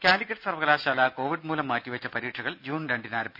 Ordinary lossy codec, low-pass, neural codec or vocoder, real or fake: none; 5.4 kHz; none; real